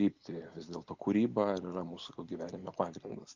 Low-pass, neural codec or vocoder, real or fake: 7.2 kHz; none; real